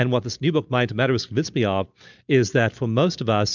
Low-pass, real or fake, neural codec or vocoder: 7.2 kHz; real; none